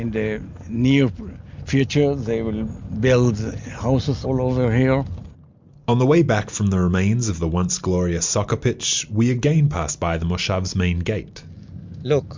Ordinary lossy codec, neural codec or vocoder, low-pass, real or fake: MP3, 64 kbps; none; 7.2 kHz; real